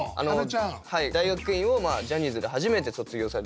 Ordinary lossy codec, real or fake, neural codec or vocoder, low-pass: none; real; none; none